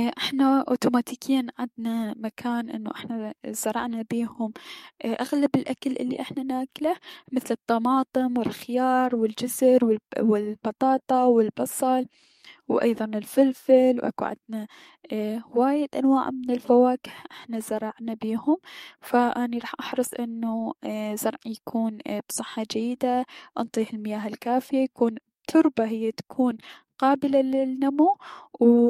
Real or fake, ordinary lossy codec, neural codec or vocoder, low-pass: fake; MP3, 64 kbps; codec, 44.1 kHz, 7.8 kbps, DAC; 19.8 kHz